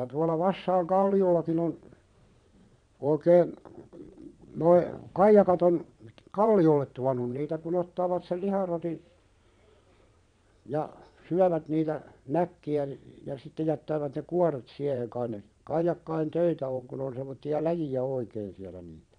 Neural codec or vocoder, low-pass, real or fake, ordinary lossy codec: vocoder, 22.05 kHz, 80 mel bands, Vocos; 9.9 kHz; fake; none